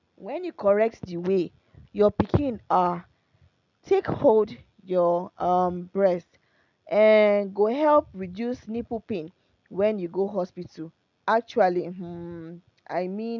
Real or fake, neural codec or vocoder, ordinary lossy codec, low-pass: real; none; none; 7.2 kHz